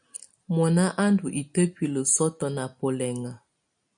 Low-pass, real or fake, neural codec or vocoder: 9.9 kHz; real; none